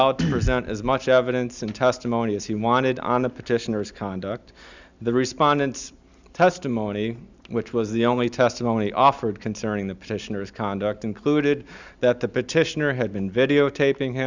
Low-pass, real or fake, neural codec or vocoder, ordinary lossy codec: 7.2 kHz; real; none; Opus, 64 kbps